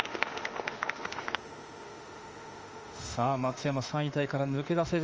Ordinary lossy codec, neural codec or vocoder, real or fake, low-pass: Opus, 24 kbps; autoencoder, 48 kHz, 32 numbers a frame, DAC-VAE, trained on Japanese speech; fake; 7.2 kHz